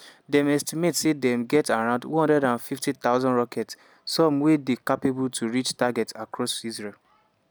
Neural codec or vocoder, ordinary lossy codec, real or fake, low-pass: none; none; real; none